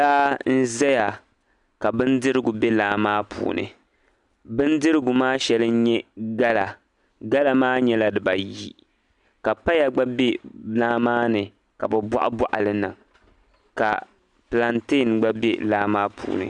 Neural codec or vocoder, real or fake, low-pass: none; real; 10.8 kHz